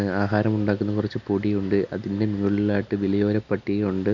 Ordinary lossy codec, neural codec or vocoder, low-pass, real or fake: none; none; 7.2 kHz; real